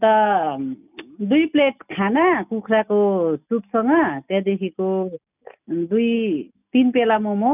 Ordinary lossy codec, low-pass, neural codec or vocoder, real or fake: none; 3.6 kHz; none; real